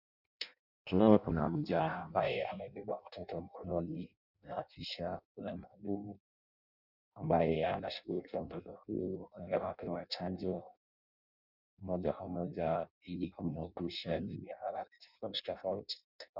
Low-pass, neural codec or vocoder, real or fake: 5.4 kHz; codec, 16 kHz in and 24 kHz out, 0.6 kbps, FireRedTTS-2 codec; fake